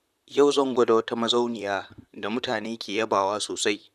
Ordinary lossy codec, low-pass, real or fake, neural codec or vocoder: none; 14.4 kHz; fake; vocoder, 44.1 kHz, 128 mel bands, Pupu-Vocoder